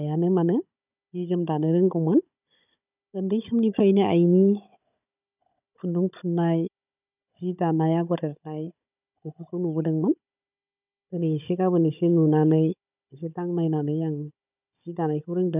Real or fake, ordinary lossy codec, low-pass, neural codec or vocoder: fake; none; 3.6 kHz; codec, 16 kHz, 16 kbps, FunCodec, trained on Chinese and English, 50 frames a second